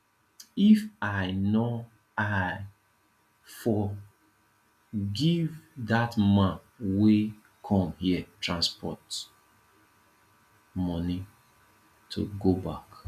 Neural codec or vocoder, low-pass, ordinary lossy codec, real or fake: none; 14.4 kHz; none; real